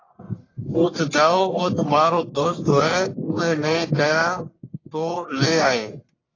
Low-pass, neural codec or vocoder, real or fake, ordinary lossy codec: 7.2 kHz; codec, 44.1 kHz, 1.7 kbps, Pupu-Codec; fake; AAC, 32 kbps